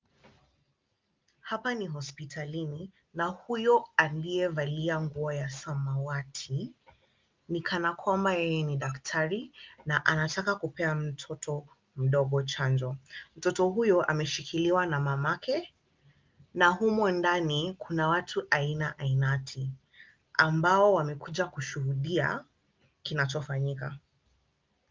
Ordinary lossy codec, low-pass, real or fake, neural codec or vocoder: Opus, 24 kbps; 7.2 kHz; real; none